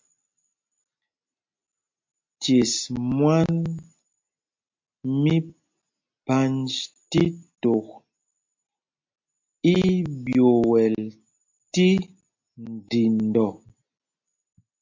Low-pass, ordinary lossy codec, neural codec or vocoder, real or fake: 7.2 kHz; MP3, 48 kbps; none; real